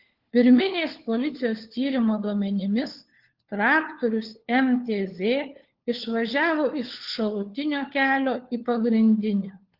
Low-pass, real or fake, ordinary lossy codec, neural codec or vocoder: 5.4 kHz; fake; Opus, 16 kbps; codec, 16 kHz, 4 kbps, FunCodec, trained on LibriTTS, 50 frames a second